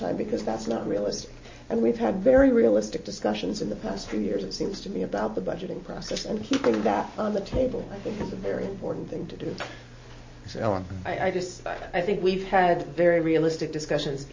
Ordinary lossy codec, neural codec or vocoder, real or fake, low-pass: MP3, 32 kbps; none; real; 7.2 kHz